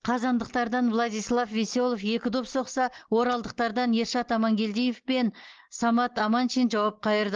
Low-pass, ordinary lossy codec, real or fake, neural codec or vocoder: 7.2 kHz; Opus, 16 kbps; real; none